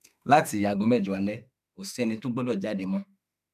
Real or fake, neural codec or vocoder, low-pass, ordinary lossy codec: fake; autoencoder, 48 kHz, 32 numbers a frame, DAC-VAE, trained on Japanese speech; 14.4 kHz; none